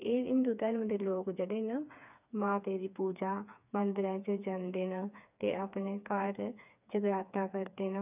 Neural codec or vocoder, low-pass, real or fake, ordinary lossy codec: codec, 16 kHz, 4 kbps, FreqCodec, smaller model; 3.6 kHz; fake; none